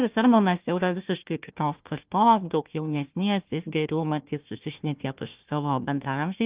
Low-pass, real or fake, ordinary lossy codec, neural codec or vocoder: 3.6 kHz; fake; Opus, 32 kbps; codec, 16 kHz, 1 kbps, FunCodec, trained on Chinese and English, 50 frames a second